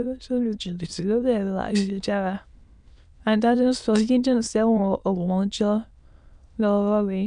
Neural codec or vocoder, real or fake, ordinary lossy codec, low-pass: autoencoder, 22.05 kHz, a latent of 192 numbers a frame, VITS, trained on many speakers; fake; none; 9.9 kHz